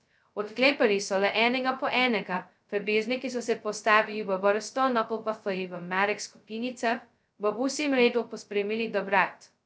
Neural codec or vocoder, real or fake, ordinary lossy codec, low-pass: codec, 16 kHz, 0.2 kbps, FocalCodec; fake; none; none